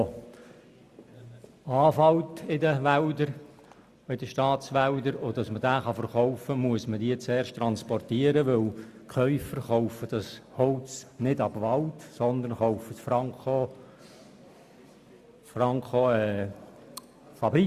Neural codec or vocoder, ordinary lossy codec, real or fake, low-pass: none; Opus, 64 kbps; real; 14.4 kHz